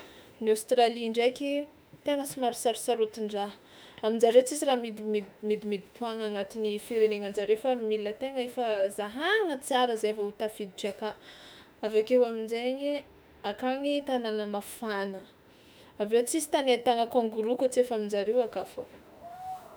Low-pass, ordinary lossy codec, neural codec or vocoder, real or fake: none; none; autoencoder, 48 kHz, 32 numbers a frame, DAC-VAE, trained on Japanese speech; fake